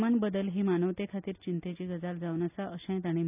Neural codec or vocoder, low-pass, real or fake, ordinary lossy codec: none; 3.6 kHz; real; none